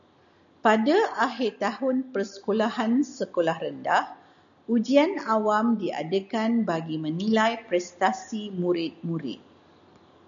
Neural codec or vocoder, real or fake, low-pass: none; real; 7.2 kHz